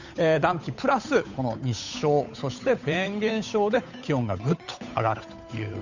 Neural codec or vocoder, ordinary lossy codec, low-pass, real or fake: codec, 16 kHz, 8 kbps, FunCodec, trained on Chinese and English, 25 frames a second; none; 7.2 kHz; fake